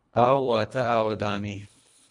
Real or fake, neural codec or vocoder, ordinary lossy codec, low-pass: fake; codec, 24 kHz, 1.5 kbps, HILCodec; AAC, 64 kbps; 10.8 kHz